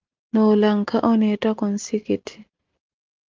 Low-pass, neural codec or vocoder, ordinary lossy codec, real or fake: 7.2 kHz; none; Opus, 32 kbps; real